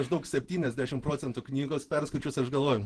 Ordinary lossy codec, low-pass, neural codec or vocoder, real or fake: Opus, 16 kbps; 10.8 kHz; vocoder, 48 kHz, 128 mel bands, Vocos; fake